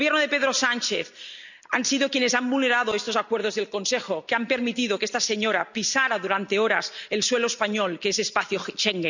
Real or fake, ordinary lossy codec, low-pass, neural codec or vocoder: real; none; 7.2 kHz; none